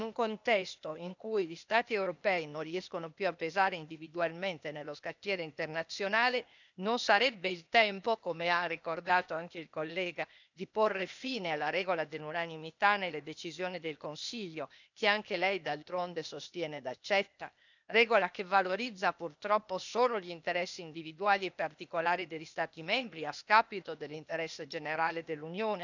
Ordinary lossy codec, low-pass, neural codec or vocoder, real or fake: none; 7.2 kHz; codec, 16 kHz, 0.8 kbps, ZipCodec; fake